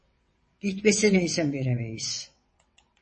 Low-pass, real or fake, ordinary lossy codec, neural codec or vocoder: 9.9 kHz; fake; MP3, 32 kbps; vocoder, 22.05 kHz, 80 mel bands, WaveNeXt